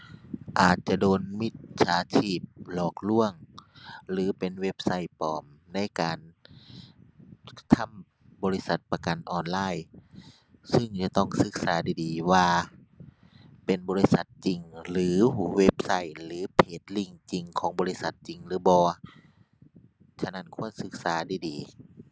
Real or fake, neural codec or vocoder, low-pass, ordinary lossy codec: real; none; none; none